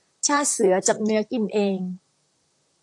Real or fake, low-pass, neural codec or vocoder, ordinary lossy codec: fake; 10.8 kHz; vocoder, 44.1 kHz, 128 mel bands, Pupu-Vocoder; AAC, 64 kbps